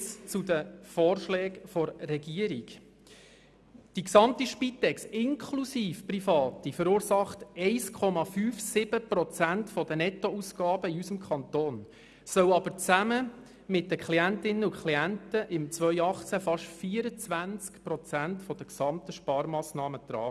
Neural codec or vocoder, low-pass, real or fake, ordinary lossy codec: none; none; real; none